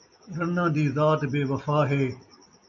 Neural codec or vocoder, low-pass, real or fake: none; 7.2 kHz; real